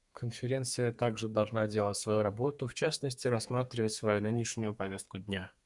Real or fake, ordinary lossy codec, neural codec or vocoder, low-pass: fake; Opus, 64 kbps; codec, 32 kHz, 1.9 kbps, SNAC; 10.8 kHz